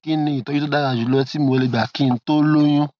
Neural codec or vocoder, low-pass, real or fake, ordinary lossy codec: none; none; real; none